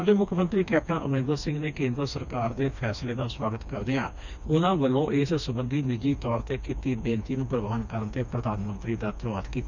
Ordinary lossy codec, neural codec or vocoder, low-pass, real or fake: none; codec, 16 kHz, 2 kbps, FreqCodec, smaller model; 7.2 kHz; fake